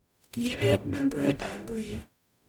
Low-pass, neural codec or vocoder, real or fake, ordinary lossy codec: 19.8 kHz; codec, 44.1 kHz, 0.9 kbps, DAC; fake; none